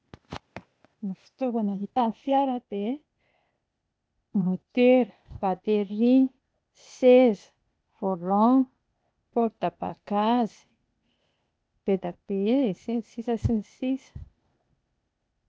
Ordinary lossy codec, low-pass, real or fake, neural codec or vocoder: none; none; fake; codec, 16 kHz, 0.8 kbps, ZipCodec